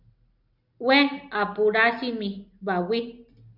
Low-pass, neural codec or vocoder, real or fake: 5.4 kHz; none; real